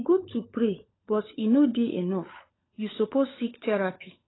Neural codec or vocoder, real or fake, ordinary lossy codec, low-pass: codec, 16 kHz, 16 kbps, FunCodec, trained on LibriTTS, 50 frames a second; fake; AAC, 16 kbps; 7.2 kHz